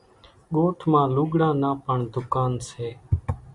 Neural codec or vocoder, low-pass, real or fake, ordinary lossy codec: none; 10.8 kHz; real; MP3, 96 kbps